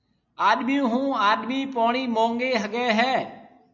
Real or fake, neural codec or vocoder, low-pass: real; none; 7.2 kHz